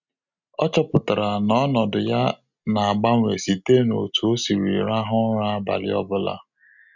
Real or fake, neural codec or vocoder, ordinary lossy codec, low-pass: real; none; none; 7.2 kHz